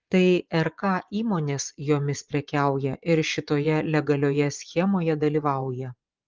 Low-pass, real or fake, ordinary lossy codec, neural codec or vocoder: 7.2 kHz; fake; Opus, 32 kbps; vocoder, 24 kHz, 100 mel bands, Vocos